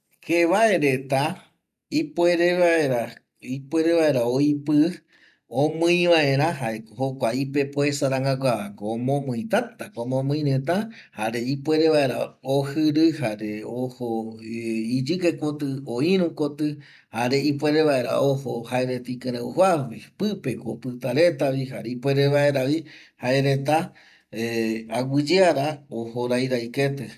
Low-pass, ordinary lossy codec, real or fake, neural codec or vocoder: 14.4 kHz; none; real; none